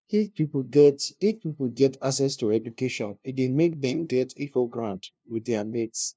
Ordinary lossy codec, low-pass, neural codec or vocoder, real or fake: none; none; codec, 16 kHz, 0.5 kbps, FunCodec, trained on LibriTTS, 25 frames a second; fake